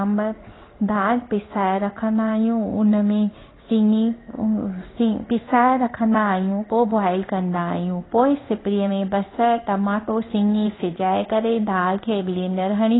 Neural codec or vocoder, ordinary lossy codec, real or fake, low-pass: codec, 16 kHz in and 24 kHz out, 1 kbps, XY-Tokenizer; AAC, 16 kbps; fake; 7.2 kHz